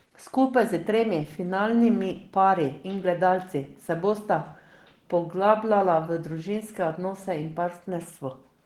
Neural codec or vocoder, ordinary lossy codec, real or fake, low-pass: none; Opus, 16 kbps; real; 19.8 kHz